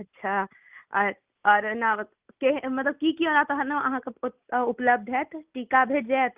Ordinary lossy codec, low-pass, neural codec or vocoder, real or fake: Opus, 32 kbps; 3.6 kHz; none; real